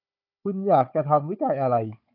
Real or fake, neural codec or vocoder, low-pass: fake; codec, 16 kHz, 4 kbps, FunCodec, trained on Chinese and English, 50 frames a second; 5.4 kHz